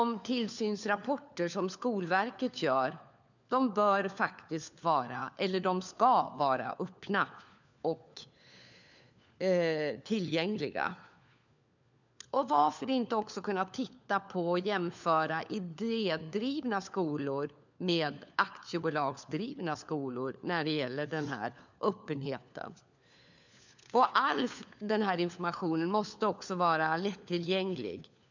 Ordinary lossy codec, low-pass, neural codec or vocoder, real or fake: none; 7.2 kHz; codec, 16 kHz, 4 kbps, FunCodec, trained on LibriTTS, 50 frames a second; fake